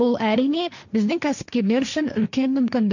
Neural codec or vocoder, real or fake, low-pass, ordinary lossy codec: codec, 16 kHz, 1.1 kbps, Voila-Tokenizer; fake; 7.2 kHz; none